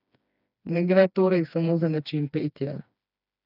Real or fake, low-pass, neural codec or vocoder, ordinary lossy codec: fake; 5.4 kHz; codec, 16 kHz, 2 kbps, FreqCodec, smaller model; none